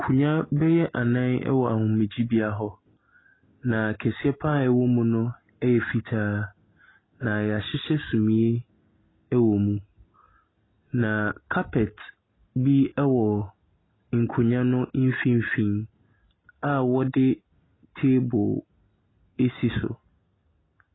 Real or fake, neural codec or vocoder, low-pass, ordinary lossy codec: real; none; 7.2 kHz; AAC, 16 kbps